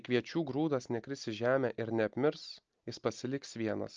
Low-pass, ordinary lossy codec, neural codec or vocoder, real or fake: 7.2 kHz; Opus, 24 kbps; none; real